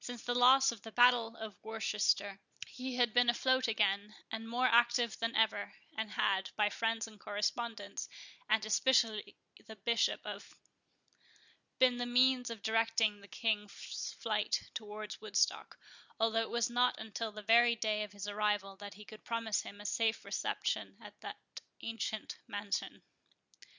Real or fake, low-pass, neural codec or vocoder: real; 7.2 kHz; none